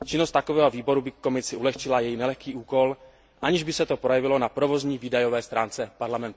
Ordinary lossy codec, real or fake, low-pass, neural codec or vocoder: none; real; none; none